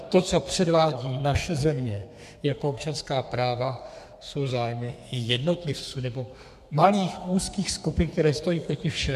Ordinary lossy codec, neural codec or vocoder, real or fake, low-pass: MP3, 96 kbps; codec, 32 kHz, 1.9 kbps, SNAC; fake; 14.4 kHz